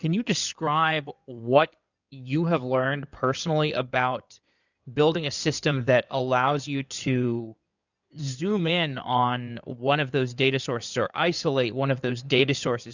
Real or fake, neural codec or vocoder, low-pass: fake; codec, 16 kHz in and 24 kHz out, 2.2 kbps, FireRedTTS-2 codec; 7.2 kHz